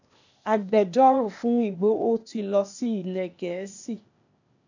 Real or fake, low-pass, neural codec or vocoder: fake; 7.2 kHz; codec, 16 kHz, 0.8 kbps, ZipCodec